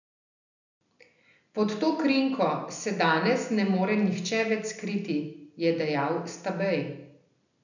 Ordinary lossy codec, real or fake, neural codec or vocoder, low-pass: none; real; none; 7.2 kHz